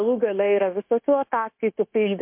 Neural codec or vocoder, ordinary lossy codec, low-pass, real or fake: codec, 16 kHz, 0.9 kbps, LongCat-Audio-Codec; MP3, 32 kbps; 3.6 kHz; fake